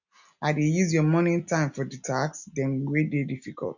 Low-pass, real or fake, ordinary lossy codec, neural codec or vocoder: 7.2 kHz; real; none; none